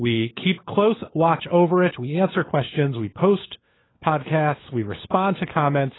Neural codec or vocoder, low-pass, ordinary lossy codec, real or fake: codec, 16 kHz, 4 kbps, FunCodec, trained on LibriTTS, 50 frames a second; 7.2 kHz; AAC, 16 kbps; fake